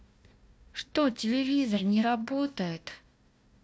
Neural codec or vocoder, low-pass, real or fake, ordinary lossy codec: codec, 16 kHz, 1 kbps, FunCodec, trained on Chinese and English, 50 frames a second; none; fake; none